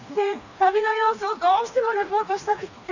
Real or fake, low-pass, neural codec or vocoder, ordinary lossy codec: fake; 7.2 kHz; codec, 16 kHz, 2 kbps, FreqCodec, smaller model; none